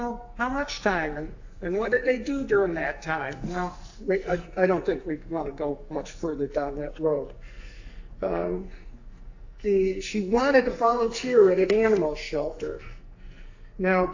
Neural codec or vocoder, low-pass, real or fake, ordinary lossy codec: codec, 44.1 kHz, 2.6 kbps, SNAC; 7.2 kHz; fake; AAC, 48 kbps